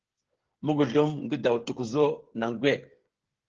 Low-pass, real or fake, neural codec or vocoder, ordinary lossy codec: 7.2 kHz; fake; codec, 16 kHz, 8 kbps, FreqCodec, smaller model; Opus, 16 kbps